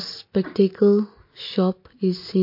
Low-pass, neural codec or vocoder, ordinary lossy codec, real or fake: 5.4 kHz; none; MP3, 32 kbps; real